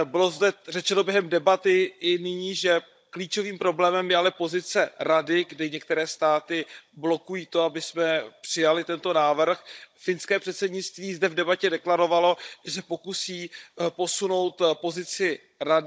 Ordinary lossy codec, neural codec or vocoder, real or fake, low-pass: none; codec, 16 kHz, 16 kbps, FunCodec, trained on Chinese and English, 50 frames a second; fake; none